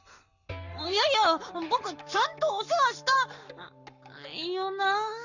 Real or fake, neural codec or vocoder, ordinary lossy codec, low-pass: fake; codec, 44.1 kHz, 7.8 kbps, Pupu-Codec; none; 7.2 kHz